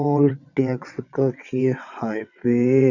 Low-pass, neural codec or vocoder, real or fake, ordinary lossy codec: 7.2 kHz; vocoder, 22.05 kHz, 80 mel bands, WaveNeXt; fake; none